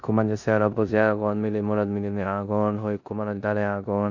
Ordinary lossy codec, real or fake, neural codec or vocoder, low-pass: Opus, 64 kbps; fake; codec, 16 kHz in and 24 kHz out, 0.9 kbps, LongCat-Audio-Codec, four codebook decoder; 7.2 kHz